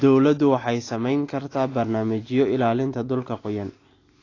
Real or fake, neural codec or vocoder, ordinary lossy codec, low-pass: real; none; AAC, 32 kbps; 7.2 kHz